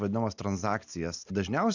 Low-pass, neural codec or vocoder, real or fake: 7.2 kHz; none; real